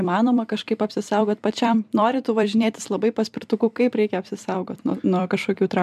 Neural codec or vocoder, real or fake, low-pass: vocoder, 44.1 kHz, 128 mel bands every 256 samples, BigVGAN v2; fake; 14.4 kHz